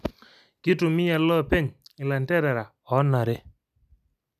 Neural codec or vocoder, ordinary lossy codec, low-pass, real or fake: none; none; 14.4 kHz; real